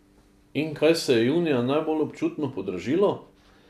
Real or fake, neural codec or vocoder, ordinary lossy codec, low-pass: real; none; none; 14.4 kHz